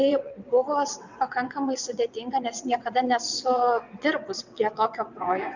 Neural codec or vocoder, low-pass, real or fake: vocoder, 22.05 kHz, 80 mel bands, WaveNeXt; 7.2 kHz; fake